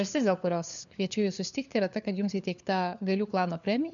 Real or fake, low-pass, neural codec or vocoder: fake; 7.2 kHz; codec, 16 kHz, 4 kbps, FunCodec, trained on LibriTTS, 50 frames a second